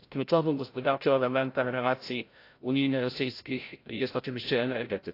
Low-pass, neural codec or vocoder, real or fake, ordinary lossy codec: 5.4 kHz; codec, 16 kHz, 0.5 kbps, FreqCodec, larger model; fake; AAC, 32 kbps